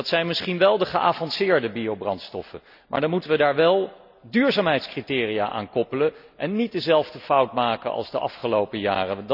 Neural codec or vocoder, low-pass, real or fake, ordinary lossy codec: none; 5.4 kHz; real; none